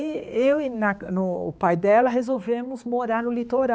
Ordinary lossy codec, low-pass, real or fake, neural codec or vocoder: none; none; fake; codec, 16 kHz, 4 kbps, X-Codec, HuBERT features, trained on balanced general audio